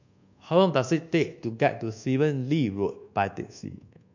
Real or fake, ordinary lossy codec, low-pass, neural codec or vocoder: fake; none; 7.2 kHz; codec, 24 kHz, 1.2 kbps, DualCodec